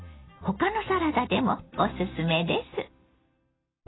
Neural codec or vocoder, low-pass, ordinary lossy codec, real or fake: none; 7.2 kHz; AAC, 16 kbps; real